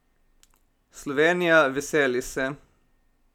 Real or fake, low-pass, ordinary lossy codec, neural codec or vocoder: real; 19.8 kHz; none; none